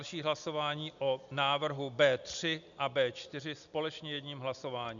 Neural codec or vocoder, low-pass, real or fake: none; 7.2 kHz; real